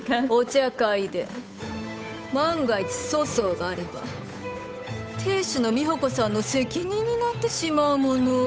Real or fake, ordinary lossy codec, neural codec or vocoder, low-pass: fake; none; codec, 16 kHz, 8 kbps, FunCodec, trained on Chinese and English, 25 frames a second; none